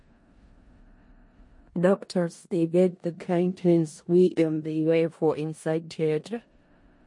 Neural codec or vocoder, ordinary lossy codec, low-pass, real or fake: codec, 16 kHz in and 24 kHz out, 0.4 kbps, LongCat-Audio-Codec, four codebook decoder; MP3, 48 kbps; 10.8 kHz; fake